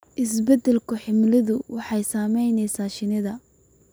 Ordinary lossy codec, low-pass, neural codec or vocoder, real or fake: none; none; none; real